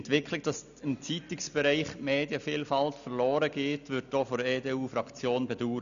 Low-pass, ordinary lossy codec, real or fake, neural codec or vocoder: 7.2 kHz; none; real; none